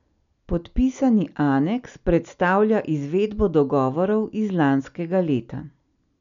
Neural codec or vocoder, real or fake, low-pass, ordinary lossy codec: none; real; 7.2 kHz; none